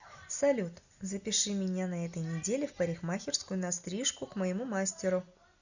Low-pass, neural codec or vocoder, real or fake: 7.2 kHz; none; real